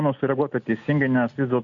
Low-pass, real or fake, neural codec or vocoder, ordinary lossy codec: 7.2 kHz; real; none; AAC, 64 kbps